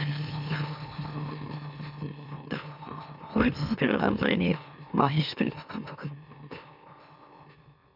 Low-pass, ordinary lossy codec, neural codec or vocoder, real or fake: 5.4 kHz; none; autoencoder, 44.1 kHz, a latent of 192 numbers a frame, MeloTTS; fake